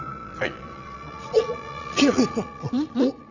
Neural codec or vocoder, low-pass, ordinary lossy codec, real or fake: vocoder, 22.05 kHz, 80 mel bands, Vocos; 7.2 kHz; none; fake